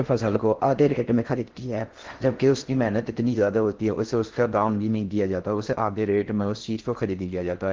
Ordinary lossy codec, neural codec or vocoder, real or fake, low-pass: Opus, 16 kbps; codec, 16 kHz in and 24 kHz out, 0.6 kbps, FocalCodec, streaming, 4096 codes; fake; 7.2 kHz